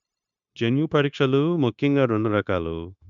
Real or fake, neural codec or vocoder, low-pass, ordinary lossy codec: fake; codec, 16 kHz, 0.9 kbps, LongCat-Audio-Codec; 7.2 kHz; none